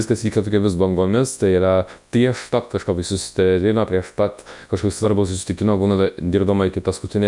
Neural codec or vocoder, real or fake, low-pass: codec, 24 kHz, 0.9 kbps, WavTokenizer, large speech release; fake; 10.8 kHz